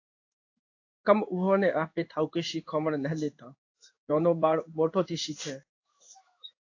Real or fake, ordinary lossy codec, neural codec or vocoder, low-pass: fake; AAC, 48 kbps; codec, 16 kHz in and 24 kHz out, 1 kbps, XY-Tokenizer; 7.2 kHz